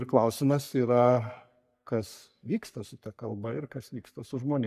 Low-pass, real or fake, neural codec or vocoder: 14.4 kHz; fake; codec, 44.1 kHz, 3.4 kbps, Pupu-Codec